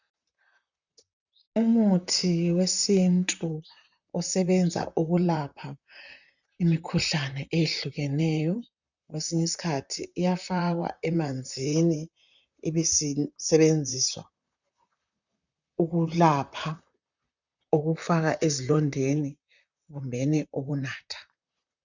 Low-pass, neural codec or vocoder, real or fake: 7.2 kHz; vocoder, 44.1 kHz, 128 mel bands, Pupu-Vocoder; fake